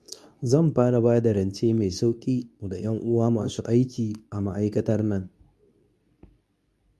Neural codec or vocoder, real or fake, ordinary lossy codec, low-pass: codec, 24 kHz, 0.9 kbps, WavTokenizer, medium speech release version 2; fake; none; none